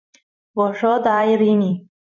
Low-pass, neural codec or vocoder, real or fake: 7.2 kHz; vocoder, 44.1 kHz, 128 mel bands every 256 samples, BigVGAN v2; fake